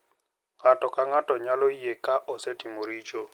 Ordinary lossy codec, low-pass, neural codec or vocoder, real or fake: Opus, 24 kbps; 19.8 kHz; none; real